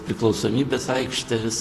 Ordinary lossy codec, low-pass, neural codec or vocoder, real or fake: AAC, 64 kbps; 14.4 kHz; vocoder, 44.1 kHz, 128 mel bands, Pupu-Vocoder; fake